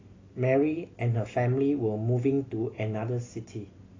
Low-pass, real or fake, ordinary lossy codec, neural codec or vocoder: 7.2 kHz; real; AAC, 32 kbps; none